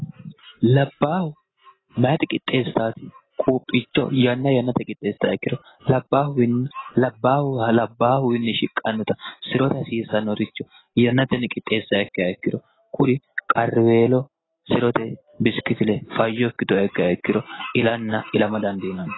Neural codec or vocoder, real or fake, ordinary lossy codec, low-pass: none; real; AAC, 16 kbps; 7.2 kHz